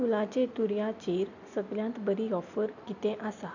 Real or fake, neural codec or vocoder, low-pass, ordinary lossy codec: real; none; 7.2 kHz; none